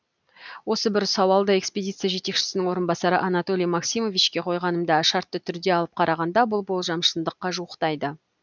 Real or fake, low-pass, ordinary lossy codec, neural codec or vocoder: real; 7.2 kHz; none; none